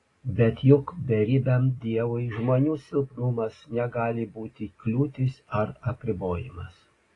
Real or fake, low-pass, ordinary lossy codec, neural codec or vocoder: real; 10.8 kHz; AAC, 32 kbps; none